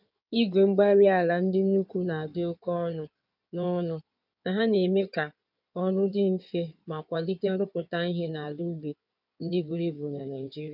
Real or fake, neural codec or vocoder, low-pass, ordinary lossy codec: fake; codec, 16 kHz in and 24 kHz out, 2.2 kbps, FireRedTTS-2 codec; 5.4 kHz; none